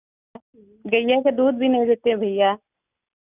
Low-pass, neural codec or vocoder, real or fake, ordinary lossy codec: 3.6 kHz; none; real; none